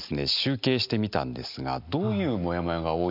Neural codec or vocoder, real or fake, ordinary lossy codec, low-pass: none; real; none; 5.4 kHz